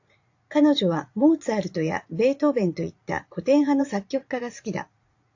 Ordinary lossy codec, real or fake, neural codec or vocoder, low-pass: AAC, 48 kbps; fake; vocoder, 44.1 kHz, 80 mel bands, Vocos; 7.2 kHz